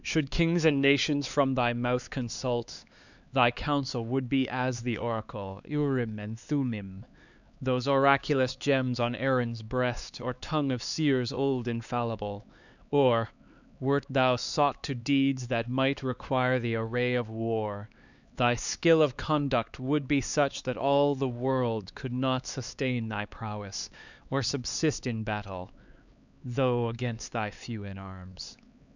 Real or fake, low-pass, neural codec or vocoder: fake; 7.2 kHz; codec, 16 kHz, 4 kbps, X-Codec, HuBERT features, trained on LibriSpeech